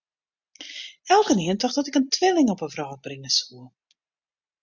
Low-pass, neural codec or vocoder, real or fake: 7.2 kHz; none; real